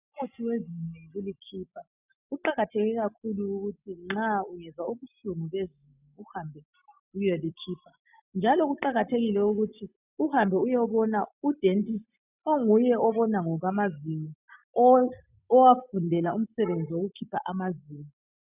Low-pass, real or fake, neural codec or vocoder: 3.6 kHz; real; none